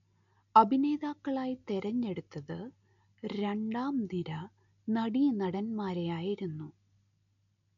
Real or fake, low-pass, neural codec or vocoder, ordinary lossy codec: real; 7.2 kHz; none; none